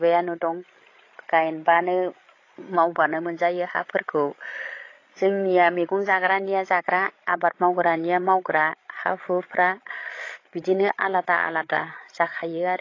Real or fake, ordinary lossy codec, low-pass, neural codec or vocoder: fake; AAC, 32 kbps; 7.2 kHz; codec, 16 kHz, 16 kbps, FreqCodec, larger model